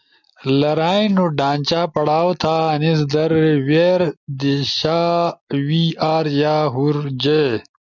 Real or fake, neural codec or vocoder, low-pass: real; none; 7.2 kHz